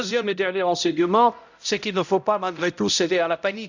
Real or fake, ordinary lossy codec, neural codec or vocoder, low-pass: fake; none; codec, 16 kHz, 0.5 kbps, X-Codec, HuBERT features, trained on balanced general audio; 7.2 kHz